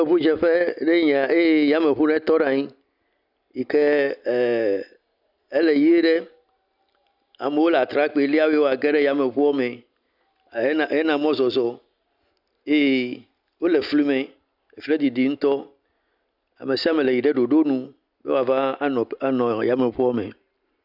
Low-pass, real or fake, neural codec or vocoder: 5.4 kHz; real; none